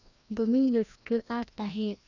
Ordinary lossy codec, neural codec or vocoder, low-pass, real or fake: none; codec, 16 kHz, 1 kbps, FreqCodec, larger model; 7.2 kHz; fake